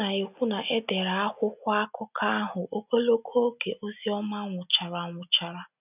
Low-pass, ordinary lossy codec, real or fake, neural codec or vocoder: 3.6 kHz; none; real; none